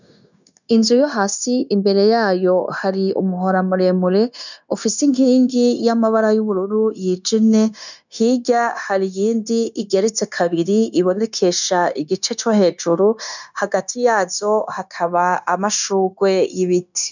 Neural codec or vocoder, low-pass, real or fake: codec, 16 kHz, 0.9 kbps, LongCat-Audio-Codec; 7.2 kHz; fake